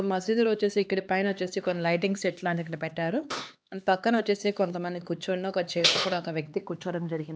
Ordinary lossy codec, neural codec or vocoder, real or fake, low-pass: none; codec, 16 kHz, 2 kbps, X-Codec, HuBERT features, trained on LibriSpeech; fake; none